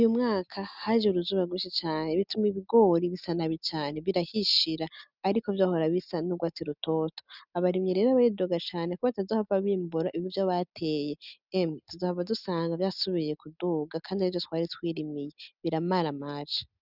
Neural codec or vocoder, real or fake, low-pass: none; real; 5.4 kHz